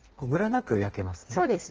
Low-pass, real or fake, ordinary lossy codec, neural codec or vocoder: 7.2 kHz; fake; Opus, 16 kbps; codec, 16 kHz in and 24 kHz out, 1.1 kbps, FireRedTTS-2 codec